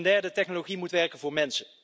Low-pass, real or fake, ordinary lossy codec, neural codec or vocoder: none; real; none; none